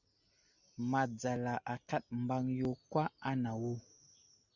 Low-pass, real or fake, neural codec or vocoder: 7.2 kHz; real; none